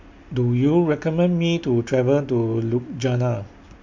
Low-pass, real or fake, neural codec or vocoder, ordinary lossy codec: 7.2 kHz; real; none; MP3, 48 kbps